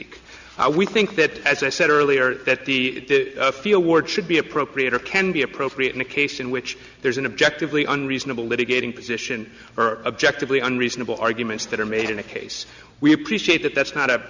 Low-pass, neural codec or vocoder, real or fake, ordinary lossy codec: 7.2 kHz; none; real; Opus, 64 kbps